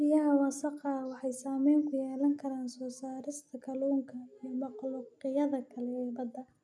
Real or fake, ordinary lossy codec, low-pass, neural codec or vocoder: real; none; none; none